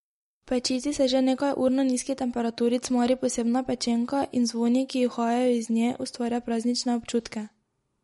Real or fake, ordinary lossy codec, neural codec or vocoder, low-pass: real; MP3, 48 kbps; none; 19.8 kHz